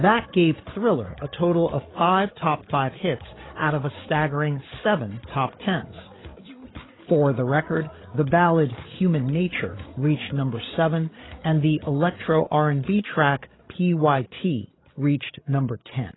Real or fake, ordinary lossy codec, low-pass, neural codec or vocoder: fake; AAC, 16 kbps; 7.2 kHz; codec, 16 kHz, 8 kbps, FreqCodec, larger model